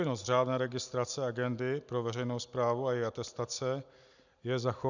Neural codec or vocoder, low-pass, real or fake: none; 7.2 kHz; real